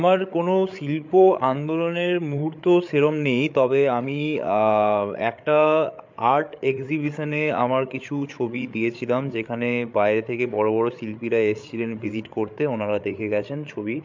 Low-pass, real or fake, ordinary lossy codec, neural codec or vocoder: 7.2 kHz; fake; MP3, 64 kbps; codec, 16 kHz, 8 kbps, FreqCodec, larger model